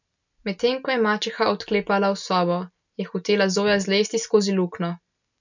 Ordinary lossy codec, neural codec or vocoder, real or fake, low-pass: none; none; real; 7.2 kHz